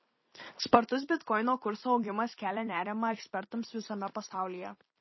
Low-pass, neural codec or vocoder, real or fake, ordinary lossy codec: 7.2 kHz; vocoder, 44.1 kHz, 128 mel bands every 256 samples, BigVGAN v2; fake; MP3, 24 kbps